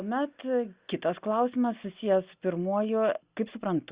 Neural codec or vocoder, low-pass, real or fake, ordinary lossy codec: none; 3.6 kHz; real; Opus, 64 kbps